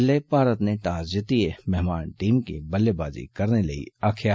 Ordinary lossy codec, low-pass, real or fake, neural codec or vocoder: none; none; real; none